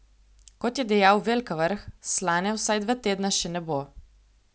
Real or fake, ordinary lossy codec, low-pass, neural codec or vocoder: real; none; none; none